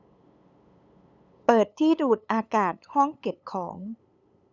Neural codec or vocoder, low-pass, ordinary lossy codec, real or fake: codec, 16 kHz, 8 kbps, FunCodec, trained on LibriTTS, 25 frames a second; 7.2 kHz; none; fake